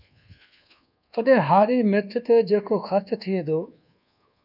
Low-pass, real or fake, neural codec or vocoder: 5.4 kHz; fake; codec, 24 kHz, 1.2 kbps, DualCodec